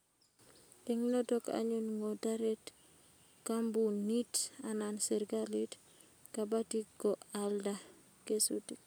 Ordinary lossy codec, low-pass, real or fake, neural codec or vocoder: none; none; real; none